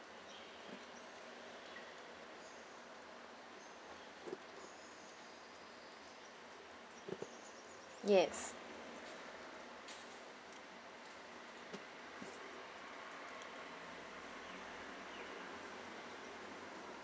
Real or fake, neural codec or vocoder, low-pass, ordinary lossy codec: real; none; none; none